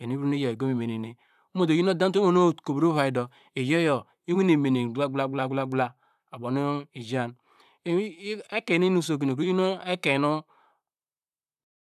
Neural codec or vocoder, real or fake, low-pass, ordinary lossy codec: vocoder, 44.1 kHz, 128 mel bands, Pupu-Vocoder; fake; 14.4 kHz; none